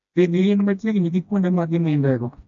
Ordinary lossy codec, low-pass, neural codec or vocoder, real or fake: AAC, 64 kbps; 7.2 kHz; codec, 16 kHz, 1 kbps, FreqCodec, smaller model; fake